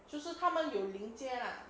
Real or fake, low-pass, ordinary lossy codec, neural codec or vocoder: real; none; none; none